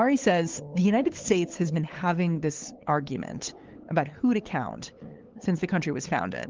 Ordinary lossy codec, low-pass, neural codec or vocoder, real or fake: Opus, 16 kbps; 7.2 kHz; codec, 16 kHz, 8 kbps, FunCodec, trained on LibriTTS, 25 frames a second; fake